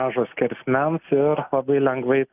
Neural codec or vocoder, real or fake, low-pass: none; real; 3.6 kHz